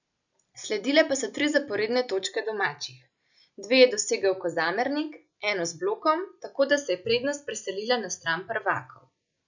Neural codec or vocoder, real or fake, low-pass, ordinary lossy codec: none; real; 7.2 kHz; none